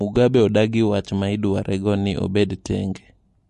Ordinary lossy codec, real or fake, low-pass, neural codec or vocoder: MP3, 48 kbps; real; 14.4 kHz; none